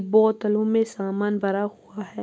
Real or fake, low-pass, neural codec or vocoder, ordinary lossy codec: real; none; none; none